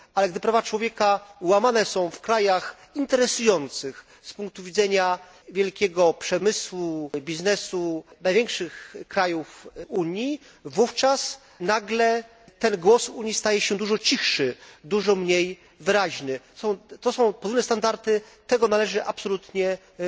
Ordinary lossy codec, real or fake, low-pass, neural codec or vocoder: none; real; none; none